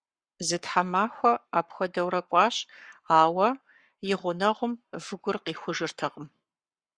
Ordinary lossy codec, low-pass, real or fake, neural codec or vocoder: Opus, 64 kbps; 9.9 kHz; fake; codec, 44.1 kHz, 7.8 kbps, Pupu-Codec